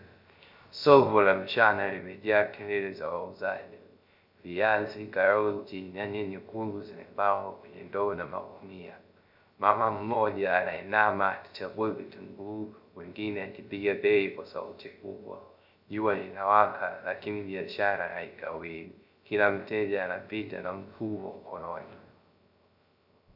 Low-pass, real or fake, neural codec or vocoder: 5.4 kHz; fake; codec, 16 kHz, 0.3 kbps, FocalCodec